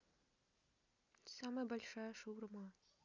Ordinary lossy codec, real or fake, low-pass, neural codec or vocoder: none; real; 7.2 kHz; none